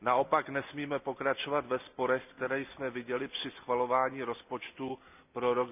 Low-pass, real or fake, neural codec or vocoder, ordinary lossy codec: 3.6 kHz; real; none; none